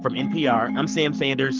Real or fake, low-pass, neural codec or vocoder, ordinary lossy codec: fake; 7.2 kHz; codec, 44.1 kHz, 7.8 kbps, Pupu-Codec; Opus, 32 kbps